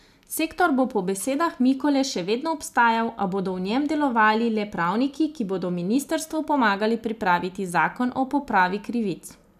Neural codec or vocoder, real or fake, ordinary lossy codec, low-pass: none; real; none; 14.4 kHz